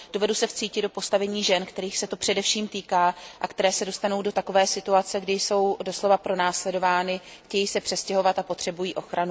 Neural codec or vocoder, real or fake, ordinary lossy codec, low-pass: none; real; none; none